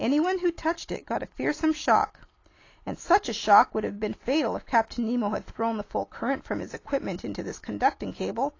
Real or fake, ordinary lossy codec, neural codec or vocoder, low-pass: real; AAC, 32 kbps; none; 7.2 kHz